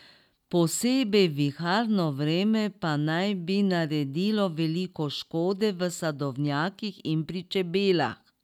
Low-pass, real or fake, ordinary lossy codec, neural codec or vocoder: 19.8 kHz; real; none; none